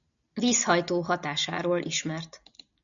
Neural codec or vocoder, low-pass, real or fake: none; 7.2 kHz; real